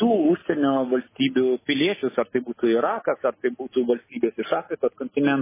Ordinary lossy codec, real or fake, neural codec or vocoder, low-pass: MP3, 16 kbps; real; none; 3.6 kHz